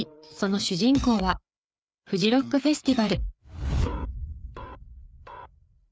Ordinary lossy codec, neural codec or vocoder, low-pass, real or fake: none; codec, 16 kHz, 4 kbps, FreqCodec, larger model; none; fake